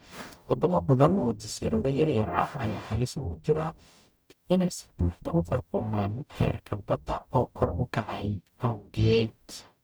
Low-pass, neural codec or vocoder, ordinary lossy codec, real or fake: none; codec, 44.1 kHz, 0.9 kbps, DAC; none; fake